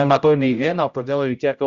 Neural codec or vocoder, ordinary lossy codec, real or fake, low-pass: codec, 16 kHz, 0.5 kbps, X-Codec, HuBERT features, trained on general audio; Opus, 64 kbps; fake; 7.2 kHz